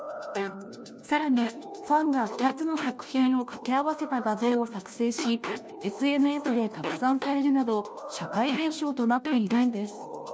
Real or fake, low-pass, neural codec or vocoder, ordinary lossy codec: fake; none; codec, 16 kHz, 1 kbps, FunCodec, trained on LibriTTS, 50 frames a second; none